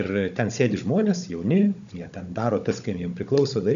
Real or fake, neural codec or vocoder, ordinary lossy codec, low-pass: fake; codec, 16 kHz, 16 kbps, FunCodec, trained on Chinese and English, 50 frames a second; MP3, 96 kbps; 7.2 kHz